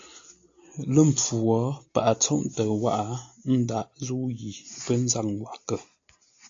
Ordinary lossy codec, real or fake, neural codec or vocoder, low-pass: AAC, 48 kbps; real; none; 7.2 kHz